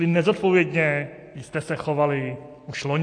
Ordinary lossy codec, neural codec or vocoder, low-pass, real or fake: AAC, 48 kbps; none; 9.9 kHz; real